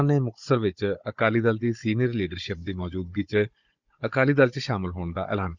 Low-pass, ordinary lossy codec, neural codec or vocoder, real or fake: 7.2 kHz; Opus, 64 kbps; codec, 16 kHz, 16 kbps, FunCodec, trained on Chinese and English, 50 frames a second; fake